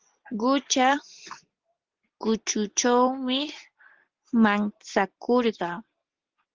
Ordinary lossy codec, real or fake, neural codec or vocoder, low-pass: Opus, 16 kbps; real; none; 7.2 kHz